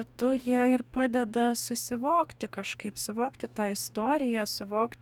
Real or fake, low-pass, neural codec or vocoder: fake; 19.8 kHz; codec, 44.1 kHz, 2.6 kbps, DAC